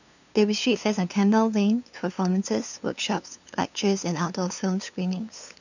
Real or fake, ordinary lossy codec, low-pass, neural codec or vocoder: fake; none; 7.2 kHz; codec, 16 kHz, 2 kbps, FunCodec, trained on LibriTTS, 25 frames a second